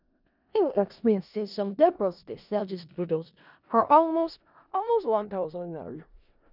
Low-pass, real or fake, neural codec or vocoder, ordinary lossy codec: 5.4 kHz; fake; codec, 16 kHz in and 24 kHz out, 0.4 kbps, LongCat-Audio-Codec, four codebook decoder; none